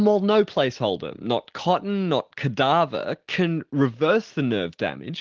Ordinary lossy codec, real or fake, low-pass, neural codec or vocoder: Opus, 16 kbps; real; 7.2 kHz; none